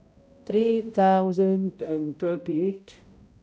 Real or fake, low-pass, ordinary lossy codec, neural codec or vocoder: fake; none; none; codec, 16 kHz, 0.5 kbps, X-Codec, HuBERT features, trained on balanced general audio